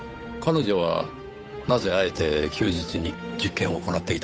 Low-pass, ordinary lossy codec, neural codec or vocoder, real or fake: none; none; codec, 16 kHz, 8 kbps, FunCodec, trained on Chinese and English, 25 frames a second; fake